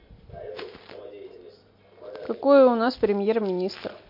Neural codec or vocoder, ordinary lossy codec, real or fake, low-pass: none; MP3, 32 kbps; real; 5.4 kHz